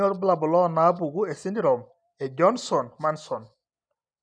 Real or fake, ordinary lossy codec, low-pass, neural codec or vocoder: real; none; 9.9 kHz; none